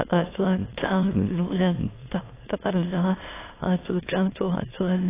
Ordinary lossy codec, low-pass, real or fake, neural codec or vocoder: AAC, 16 kbps; 3.6 kHz; fake; autoencoder, 22.05 kHz, a latent of 192 numbers a frame, VITS, trained on many speakers